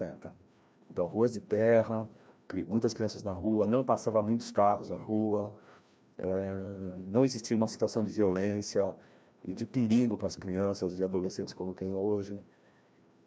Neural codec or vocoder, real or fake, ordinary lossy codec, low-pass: codec, 16 kHz, 1 kbps, FreqCodec, larger model; fake; none; none